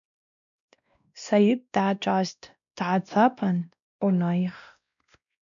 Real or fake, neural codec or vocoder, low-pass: fake; codec, 16 kHz, 1 kbps, X-Codec, WavLM features, trained on Multilingual LibriSpeech; 7.2 kHz